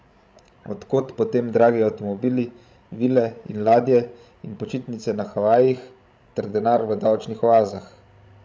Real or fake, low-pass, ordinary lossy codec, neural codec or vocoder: fake; none; none; codec, 16 kHz, 16 kbps, FreqCodec, larger model